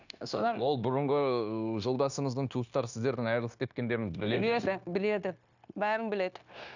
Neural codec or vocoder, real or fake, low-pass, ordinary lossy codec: codec, 16 kHz, 0.9 kbps, LongCat-Audio-Codec; fake; 7.2 kHz; none